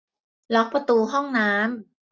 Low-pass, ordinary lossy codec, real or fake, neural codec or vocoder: none; none; real; none